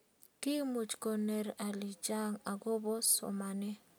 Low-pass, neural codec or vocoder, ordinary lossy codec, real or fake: none; none; none; real